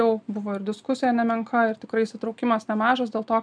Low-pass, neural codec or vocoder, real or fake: 9.9 kHz; none; real